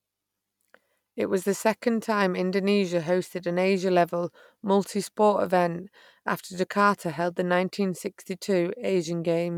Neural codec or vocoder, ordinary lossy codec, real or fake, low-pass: none; none; real; 19.8 kHz